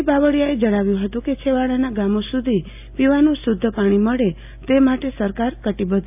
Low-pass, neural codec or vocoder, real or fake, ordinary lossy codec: 3.6 kHz; none; real; AAC, 32 kbps